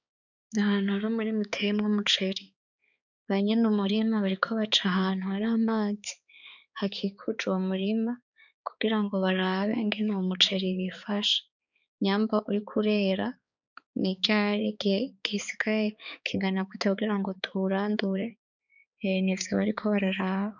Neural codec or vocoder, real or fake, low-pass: codec, 16 kHz, 4 kbps, X-Codec, HuBERT features, trained on balanced general audio; fake; 7.2 kHz